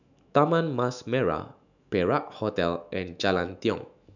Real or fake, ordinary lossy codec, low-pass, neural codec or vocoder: fake; none; 7.2 kHz; autoencoder, 48 kHz, 128 numbers a frame, DAC-VAE, trained on Japanese speech